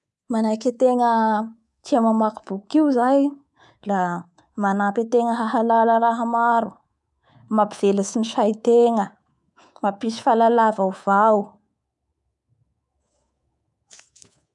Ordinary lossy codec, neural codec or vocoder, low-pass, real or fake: none; codec, 24 kHz, 3.1 kbps, DualCodec; 10.8 kHz; fake